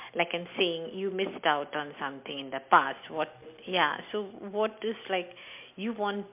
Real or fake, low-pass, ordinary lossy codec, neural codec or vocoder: real; 3.6 kHz; MP3, 32 kbps; none